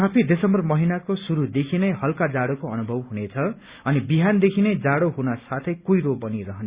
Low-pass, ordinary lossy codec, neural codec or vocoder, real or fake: 3.6 kHz; Opus, 64 kbps; none; real